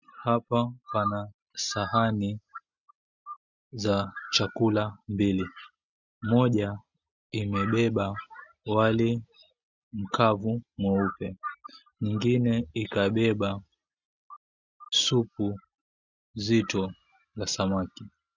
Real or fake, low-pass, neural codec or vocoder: real; 7.2 kHz; none